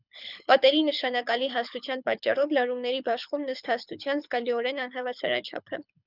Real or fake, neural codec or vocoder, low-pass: fake; codec, 24 kHz, 6 kbps, HILCodec; 5.4 kHz